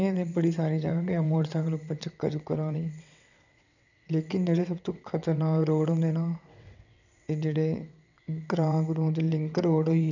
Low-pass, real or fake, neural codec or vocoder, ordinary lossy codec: 7.2 kHz; fake; vocoder, 22.05 kHz, 80 mel bands, WaveNeXt; none